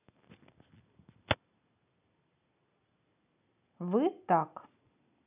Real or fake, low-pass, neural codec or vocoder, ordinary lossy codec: real; 3.6 kHz; none; none